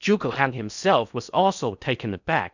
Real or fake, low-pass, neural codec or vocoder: fake; 7.2 kHz; codec, 16 kHz in and 24 kHz out, 0.8 kbps, FocalCodec, streaming, 65536 codes